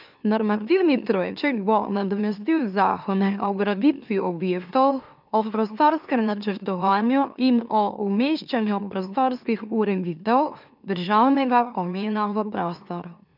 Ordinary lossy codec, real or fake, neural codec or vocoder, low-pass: none; fake; autoencoder, 44.1 kHz, a latent of 192 numbers a frame, MeloTTS; 5.4 kHz